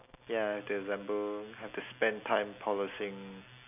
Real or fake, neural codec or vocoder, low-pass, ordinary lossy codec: real; none; 3.6 kHz; none